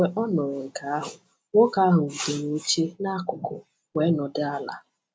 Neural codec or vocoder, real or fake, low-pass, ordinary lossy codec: none; real; none; none